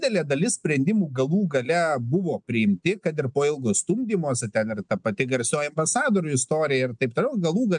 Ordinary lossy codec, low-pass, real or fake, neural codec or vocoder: MP3, 96 kbps; 9.9 kHz; real; none